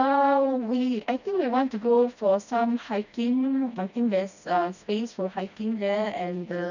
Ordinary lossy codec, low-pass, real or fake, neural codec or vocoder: none; 7.2 kHz; fake; codec, 16 kHz, 1 kbps, FreqCodec, smaller model